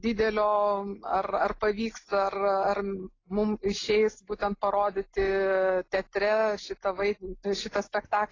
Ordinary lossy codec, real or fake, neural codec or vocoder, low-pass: AAC, 32 kbps; real; none; 7.2 kHz